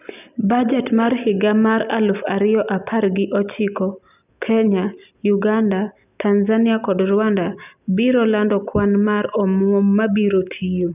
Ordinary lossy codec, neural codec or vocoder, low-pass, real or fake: none; none; 3.6 kHz; real